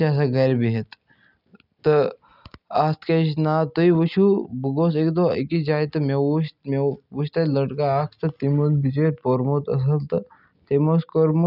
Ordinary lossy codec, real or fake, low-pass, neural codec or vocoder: none; real; 5.4 kHz; none